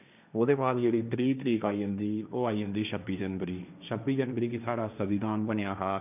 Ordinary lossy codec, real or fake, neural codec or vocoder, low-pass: none; fake; codec, 16 kHz, 1.1 kbps, Voila-Tokenizer; 3.6 kHz